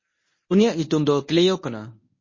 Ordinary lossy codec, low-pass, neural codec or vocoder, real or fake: MP3, 32 kbps; 7.2 kHz; codec, 24 kHz, 0.9 kbps, WavTokenizer, medium speech release version 1; fake